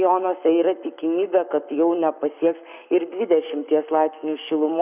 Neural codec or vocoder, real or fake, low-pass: codec, 44.1 kHz, 7.8 kbps, Pupu-Codec; fake; 3.6 kHz